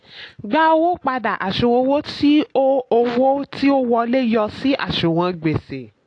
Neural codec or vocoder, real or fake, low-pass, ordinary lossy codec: none; real; 9.9 kHz; AAC, 48 kbps